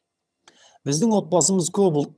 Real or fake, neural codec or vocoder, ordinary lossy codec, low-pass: fake; vocoder, 22.05 kHz, 80 mel bands, HiFi-GAN; none; none